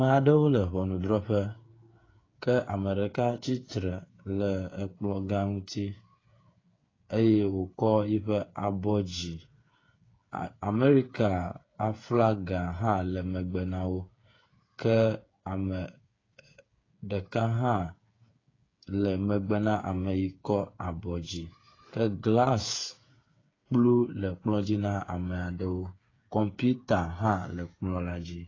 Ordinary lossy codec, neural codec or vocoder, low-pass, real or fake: AAC, 32 kbps; codec, 16 kHz, 8 kbps, FreqCodec, smaller model; 7.2 kHz; fake